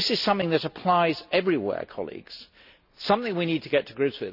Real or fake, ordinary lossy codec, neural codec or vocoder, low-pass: real; none; none; 5.4 kHz